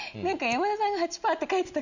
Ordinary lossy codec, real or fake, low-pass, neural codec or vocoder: none; real; 7.2 kHz; none